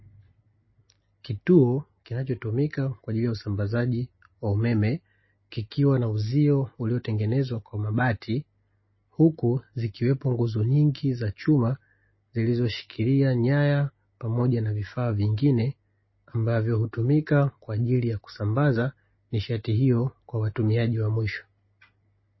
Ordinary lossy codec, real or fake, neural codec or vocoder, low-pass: MP3, 24 kbps; real; none; 7.2 kHz